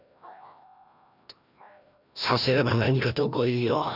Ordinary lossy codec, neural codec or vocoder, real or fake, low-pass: none; codec, 16 kHz, 1 kbps, FreqCodec, larger model; fake; 5.4 kHz